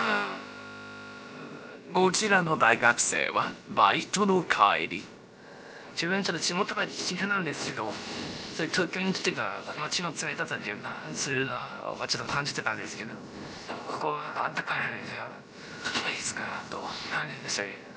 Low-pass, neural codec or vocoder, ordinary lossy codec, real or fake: none; codec, 16 kHz, about 1 kbps, DyCAST, with the encoder's durations; none; fake